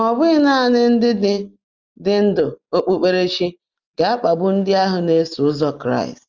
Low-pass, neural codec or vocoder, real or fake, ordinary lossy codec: 7.2 kHz; none; real; Opus, 32 kbps